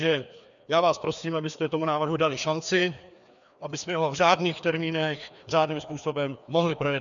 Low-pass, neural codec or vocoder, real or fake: 7.2 kHz; codec, 16 kHz, 2 kbps, FreqCodec, larger model; fake